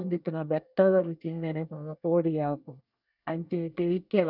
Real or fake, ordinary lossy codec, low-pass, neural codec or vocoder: fake; none; 5.4 kHz; codec, 24 kHz, 1 kbps, SNAC